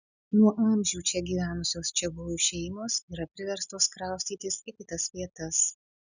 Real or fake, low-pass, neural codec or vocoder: real; 7.2 kHz; none